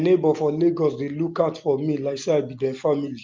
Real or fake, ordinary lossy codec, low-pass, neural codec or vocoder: real; Opus, 32 kbps; 7.2 kHz; none